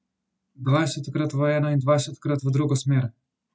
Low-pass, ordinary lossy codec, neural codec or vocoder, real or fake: none; none; none; real